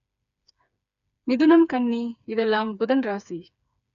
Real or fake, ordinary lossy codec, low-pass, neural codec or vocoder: fake; none; 7.2 kHz; codec, 16 kHz, 4 kbps, FreqCodec, smaller model